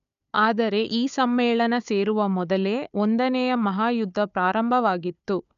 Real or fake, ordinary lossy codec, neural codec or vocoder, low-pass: fake; none; codec, 16 kHz, 4 kbps, FunCodec, trained on Chinese and English, 50 frames a second; 7.2 kHz